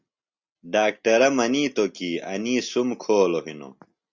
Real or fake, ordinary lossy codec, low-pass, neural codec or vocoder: real; Opus, 64 kbps; 7.2 kHz; none